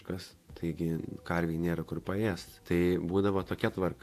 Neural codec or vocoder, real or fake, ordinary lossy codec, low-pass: vocoder, 48 kHz, 128 mel bands, Vocos; fake; AAC, 64 kbps; 14.4 kHz